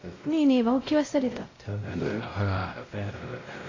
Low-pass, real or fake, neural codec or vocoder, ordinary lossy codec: 7.2 kHz; fake; codec, 16 kHz, 0.5 kbps, X-Codec, WavLM features, trained on Multilingual LibriSpeech; AAC, 32 kbps